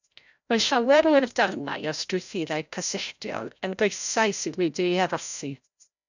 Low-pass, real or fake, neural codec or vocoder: 7.2 kHz; fake; codec, 16 kHz, 0.5 kbps, FreqCodec, larger model